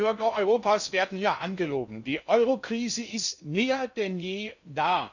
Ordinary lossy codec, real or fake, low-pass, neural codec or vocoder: none; fake; 7.2 kHz; codec, 16 kHz in and 24 kHz out, 0.6 kbps, FocalCodec, streaming, 2048 codes